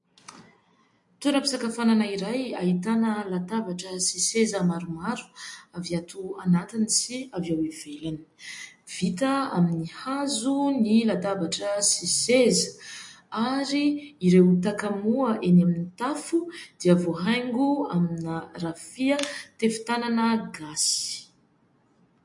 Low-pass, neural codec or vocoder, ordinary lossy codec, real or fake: 10.8 kHz; none; MP3, 48 kbps; real